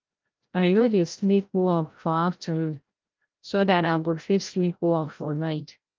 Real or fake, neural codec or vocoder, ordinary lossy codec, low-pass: fake; codec, 16 kHz, 0.5 kbps, FreqCodec, larger model; Opus, 24 kbps; 7.2 kHz